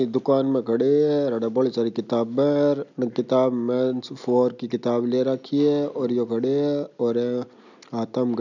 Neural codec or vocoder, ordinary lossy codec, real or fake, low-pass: none; none; real; 7.2 kHz